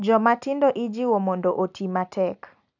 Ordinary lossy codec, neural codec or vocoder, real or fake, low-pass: none; none; real; 7.2 kHz